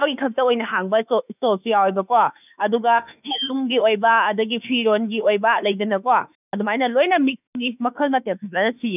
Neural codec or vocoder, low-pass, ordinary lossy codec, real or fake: autoencoder, 48 kHz, 32 numbers a frame, DAC-VAE, trained on Japanese speech; 3.6 kHz; none; fake